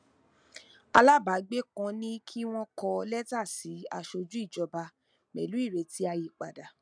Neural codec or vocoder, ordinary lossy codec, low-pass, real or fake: none; none; 9.9 kHz; real